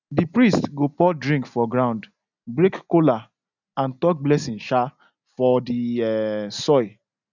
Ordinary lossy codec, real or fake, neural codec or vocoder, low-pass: none; real; none; 7.2 kHz